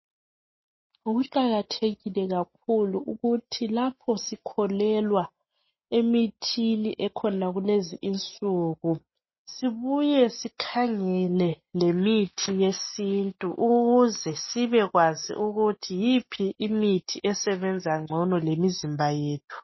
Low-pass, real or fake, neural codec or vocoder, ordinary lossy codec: 7.2 kHz; real; none; MP3, 24 kbps